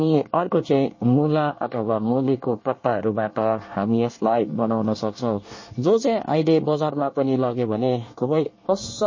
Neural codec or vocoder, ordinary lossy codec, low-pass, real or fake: codec, 24 kHz, 1 kbps, SNAC; MP3, 32 kbps; 7.2 kHz; fake